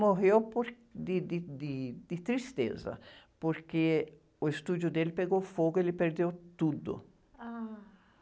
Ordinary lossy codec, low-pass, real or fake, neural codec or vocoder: none; none; real; none